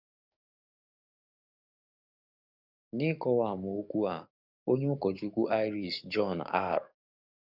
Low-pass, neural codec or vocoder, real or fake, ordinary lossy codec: 5.4 kHz; codec, 44.1 kHz, 7.8 kbps, DAC; fake; none